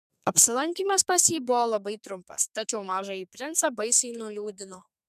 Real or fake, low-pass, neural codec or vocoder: fake; 14.4 kHz; codec, 32 kHz, 1.9 kbps, SNAC